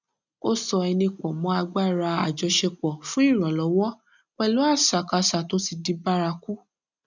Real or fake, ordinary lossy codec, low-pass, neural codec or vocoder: real; none; 7.2 kHz; none